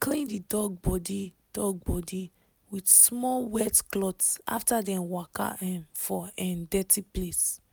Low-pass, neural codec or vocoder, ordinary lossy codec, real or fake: none; none; none; real